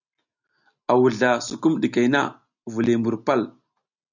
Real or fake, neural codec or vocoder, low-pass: real; none; 7.2 kHz